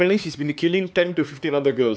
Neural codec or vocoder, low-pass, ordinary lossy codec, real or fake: codec, 16 kHz, 4 kbps, X-Codec, HuBERT features, trained on LibriSpeech; none; none; fake